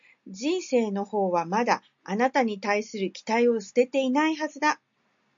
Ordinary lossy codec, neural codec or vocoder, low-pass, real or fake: MP3, 48 kbps; none; 7.2 kHz; real